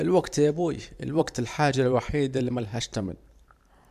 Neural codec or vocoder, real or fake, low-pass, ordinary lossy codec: vocoder, 44.1 kHz, 128 mel bands every 512 samples, BigVGAN v2; fake; 14.4 kHz; none